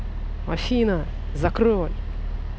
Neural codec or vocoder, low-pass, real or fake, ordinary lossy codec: none; none; real; none